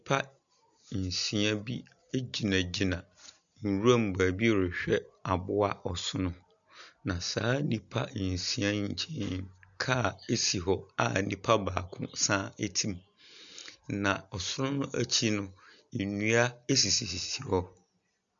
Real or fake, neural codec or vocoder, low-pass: real; none; 7.2 kHz